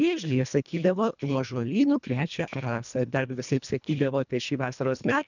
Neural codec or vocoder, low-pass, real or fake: codec, 24 kHz, 1.5 kbps, HILCodec; 7.2 kHz; fake